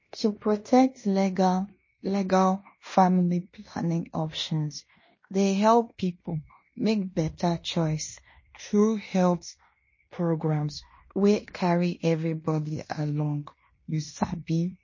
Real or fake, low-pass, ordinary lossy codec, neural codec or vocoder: fake; 7.2 kHz; MP3, 32 kbps; codec, 16 kHz in and 24 kHz out, 0.9 kbps, LongCat-Audio-Codec, fine tuned four codebook decoder